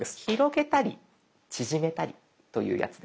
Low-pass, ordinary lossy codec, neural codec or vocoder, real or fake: none; none; none; real